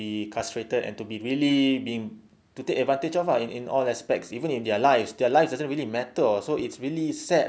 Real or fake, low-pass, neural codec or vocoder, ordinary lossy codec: real; none; none; none